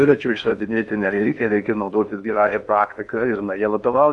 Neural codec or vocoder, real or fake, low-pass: codec, 16 kHz in and 24 kHz out, 0.8 kbps, FocalCodec, streaming, 65536 codes; fake; 10.8 kHz